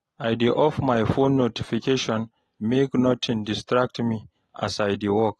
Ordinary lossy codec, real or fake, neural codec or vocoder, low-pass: AAC, 32 kbps; real; none; 19.8 kHz